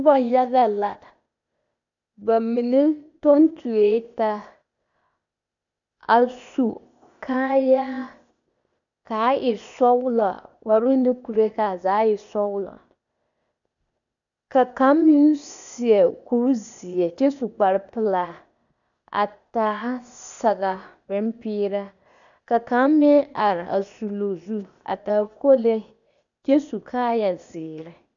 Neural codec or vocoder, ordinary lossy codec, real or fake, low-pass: codec, 16 kHz, 0.8 kbps, ZipCodec; MP3, 96 kbps; fake; 7.2 kHz